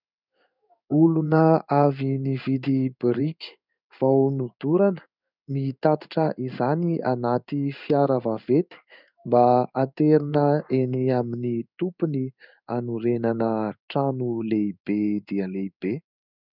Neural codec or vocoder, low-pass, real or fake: codec, 16 kHz in and 24 kHz out, 1 kbps, XY-Tokenizer; 5.4 kHz; fake